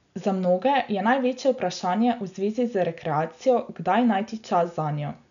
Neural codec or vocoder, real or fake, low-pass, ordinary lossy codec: none; real; 7.2 kHz; none